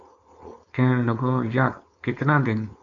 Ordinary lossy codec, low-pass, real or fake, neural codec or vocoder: MP3, 64 kbps; 7.2 kHz; fake; codec, 16 kHz, 4.8 kbps, FACodec